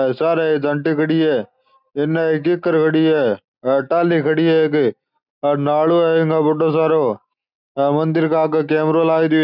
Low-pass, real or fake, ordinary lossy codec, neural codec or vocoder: 5.4 kHz; real; none; none